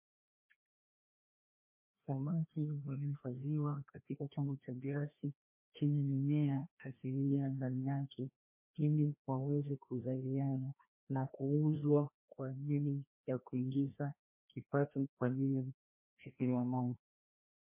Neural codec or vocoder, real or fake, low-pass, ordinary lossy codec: codec, 16 kHz, 1 kbps, FreqCodec, larger model; fake; 3.6 kHz; MP3, 24 kbps